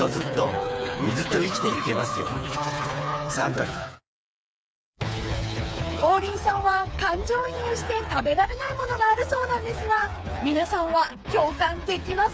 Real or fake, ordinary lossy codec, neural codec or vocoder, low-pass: fake; none; codec, 16 kHz, 4 kbps, FreqCodec, smaller model; none